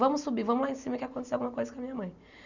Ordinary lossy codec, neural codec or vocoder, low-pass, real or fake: none; none; 7.2 kHz; real